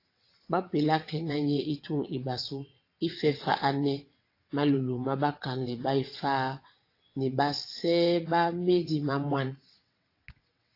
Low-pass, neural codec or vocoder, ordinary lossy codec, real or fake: 5.4 kHz; vocoder, 22.05 kHz, 80 mel bands, WaveNeXt; AAC, 32 kbps; fake